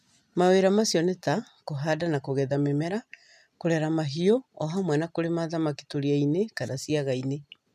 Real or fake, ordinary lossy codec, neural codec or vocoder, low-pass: real; none; none; 14.4 kHz